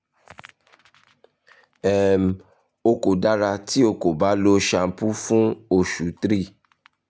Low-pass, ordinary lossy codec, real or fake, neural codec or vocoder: none; none; real; none